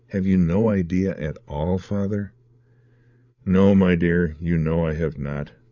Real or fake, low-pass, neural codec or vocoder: fake; 7.2 kHz; codec, 16 kHz, 8 kbps, FreqCodec, larger model